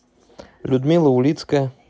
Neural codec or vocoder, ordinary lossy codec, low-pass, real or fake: none; none; none; real